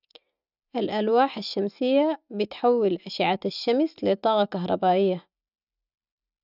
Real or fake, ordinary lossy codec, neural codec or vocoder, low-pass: real; none; none; 5.4 kHz